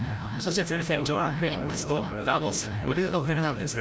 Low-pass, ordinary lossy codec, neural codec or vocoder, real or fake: none; none; codec, 16 kHz, 0.5 kbps, FreqCodec, larger model; fake